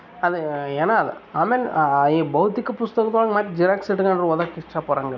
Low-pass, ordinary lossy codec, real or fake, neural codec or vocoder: 7.2 kHz; none; real; none